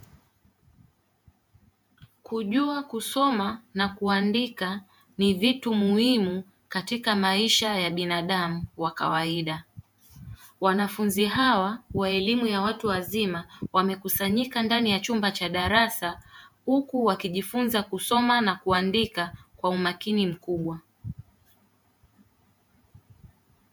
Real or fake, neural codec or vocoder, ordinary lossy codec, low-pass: fake; vocoder, 48 kHz, 128 mel bands, Vocos; MP3, 96 kbps; 19.8 kHz